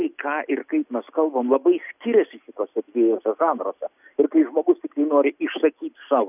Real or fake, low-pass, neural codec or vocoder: real; 3.6 kHz; none